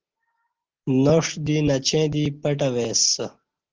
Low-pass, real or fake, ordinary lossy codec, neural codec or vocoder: 7.2 kHz; real; Opus, 16 kbps; none